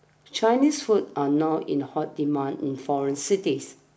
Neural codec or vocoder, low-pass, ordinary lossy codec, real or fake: none; none; none; real